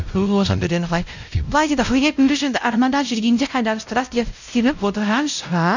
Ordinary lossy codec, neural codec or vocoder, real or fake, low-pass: none; codec, 16 kHz, 0.5 kbps, X-Codec, WavLM features, trained on Multilingual LibriSpeech; fake; 7.2 kHz